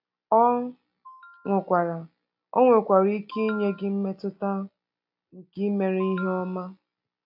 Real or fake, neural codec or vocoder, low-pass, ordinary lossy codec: real; none; 5.4 kHz; AAC, 48 kbps